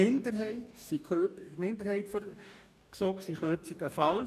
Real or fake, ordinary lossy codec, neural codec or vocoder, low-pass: fake; none; codec, 44.1 kHz, 2.6 kbps, DAC; 14.4 kHz